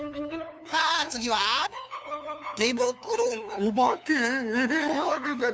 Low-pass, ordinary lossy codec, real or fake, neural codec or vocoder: none; none; fake; codec, 16 kHz, 2 kbps, FunCodec, trained on LibriTTS, 25 frames a second